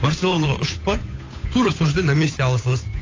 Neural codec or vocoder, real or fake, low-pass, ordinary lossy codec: codec, 16 kHz, 8 kbps, FunCodec, trained on Chinese and English, 25 frames a second; fake; 7.2 kHz; AAC, 32 kbps